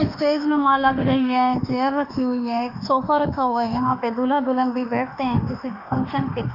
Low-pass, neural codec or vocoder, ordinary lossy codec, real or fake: 5.4 kHz; autoencoder, 48 kHz, 32 numbers a frame, DAC-VAE, trained on Japanese speech; none; fake